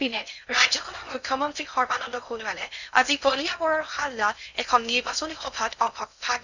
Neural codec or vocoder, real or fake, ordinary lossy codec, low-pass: codec, 16 kHz in and 24 kHz out, 0.6 kbps, FocalCodec, streaming, 4096 codes; fake; none; 7.2 kHz